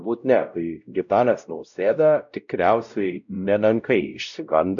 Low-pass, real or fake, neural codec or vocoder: 7.2 kHz; fake; codec, 16 kHz, 0.5 kbps, X-Codec, WavLM features, trained on Multilingual LibriSpeech